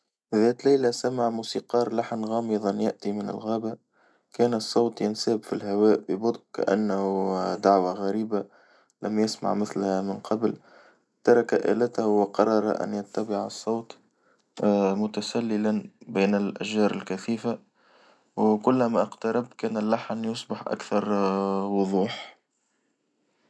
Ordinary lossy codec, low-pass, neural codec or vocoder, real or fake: none; none; none; real